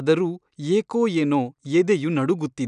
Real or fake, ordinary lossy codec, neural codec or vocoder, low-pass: real; none; none; 9.9 kHz